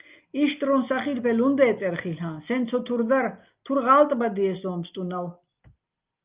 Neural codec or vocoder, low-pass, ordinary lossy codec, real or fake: none; 3.6 kHz; Opus, 24 kbps; real